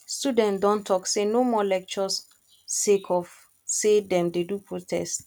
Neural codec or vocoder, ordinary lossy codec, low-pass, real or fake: none; none; 19.8 kHz; real